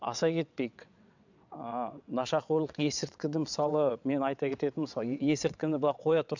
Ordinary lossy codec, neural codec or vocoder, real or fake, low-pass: none; vocoder, 22.05 kHz, 80 mel bands, WaveNeXt; fake; 7.2 kHz